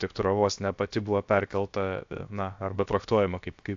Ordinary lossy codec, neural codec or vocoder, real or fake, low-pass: AAC, 64 kbps; codec, 16 kHz, 0.7 kbps, FocalCodec; fake; 7.2 kHz